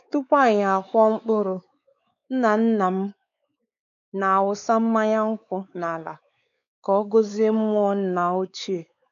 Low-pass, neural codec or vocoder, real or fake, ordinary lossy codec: 7.2 kHz; codec, 16 kHz, 4 kbps, X-Codec, WavLM features, trained on Multilingual LibriSpeech; fake; AAC, 96 kbps